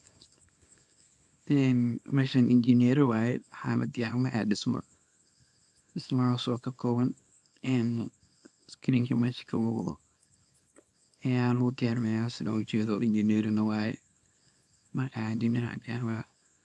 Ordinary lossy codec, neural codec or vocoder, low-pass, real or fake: none; codec, 24 kHz, 0.9 kbps, WavTokenizer, small release; none; fake